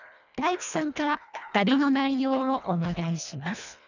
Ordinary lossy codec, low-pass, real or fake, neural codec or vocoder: none; 7.2 kHz; fake; codec, 24 kHz, 1.5 kbps, HILCodec